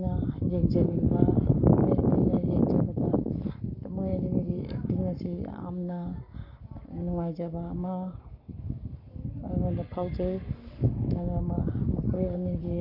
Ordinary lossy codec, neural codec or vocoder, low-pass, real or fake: none; codec, 44.1 kHz, 7.8 kbps, Pupu-Codec; 5.4 kHz; fake